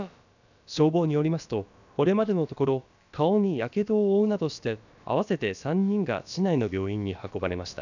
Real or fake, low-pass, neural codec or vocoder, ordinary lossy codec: fake; 7.2 kHz; codec, 16 kHz, about 1 kbps, DyCAST, with the encoder's durations; none